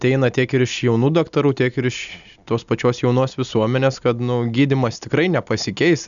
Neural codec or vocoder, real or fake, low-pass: none; real; 7.2 kHz